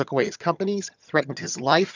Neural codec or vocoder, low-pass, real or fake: vocoder, 22.05 kHz, 80 mel bands, HiFi-GAN; 7.2 kHz; fake